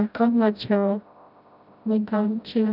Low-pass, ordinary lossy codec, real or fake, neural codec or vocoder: 5.4 kHz; none; fake; codec, 16 kHz, 0.5 kbps, FreqCodec, smaller model